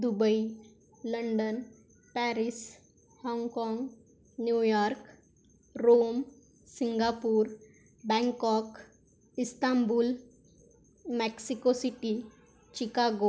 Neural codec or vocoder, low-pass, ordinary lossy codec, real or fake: none; none; none; real